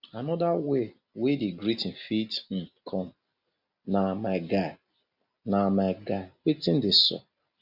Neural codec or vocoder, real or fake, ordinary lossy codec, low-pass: none; real; none; 5.4 kHz